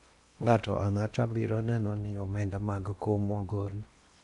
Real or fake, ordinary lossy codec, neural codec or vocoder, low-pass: fake; none; codec, 16 kHz in and 24 kHz out, 0.8 kbps, FocalCodec, streaming, 65536 codes; 10.8 kHz